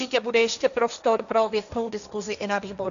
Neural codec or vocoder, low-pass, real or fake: codec, 16 kHz, 1.1 kbps, Voila-Tokenizer; 7.2 kHz; fake